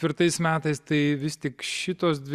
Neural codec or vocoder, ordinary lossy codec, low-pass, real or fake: none; Opus, 64 kbps; 14.4 kHz; real